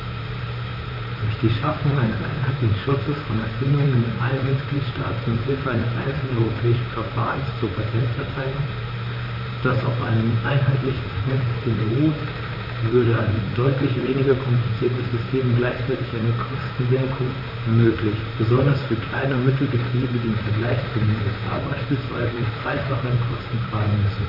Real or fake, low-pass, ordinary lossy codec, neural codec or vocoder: fake; 5.4 kHz; none; vocoder, 44.1 kHz, 128 mel bands, Pupu-Vocoder